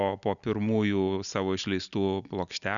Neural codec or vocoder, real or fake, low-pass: none; real; 7.2 kHz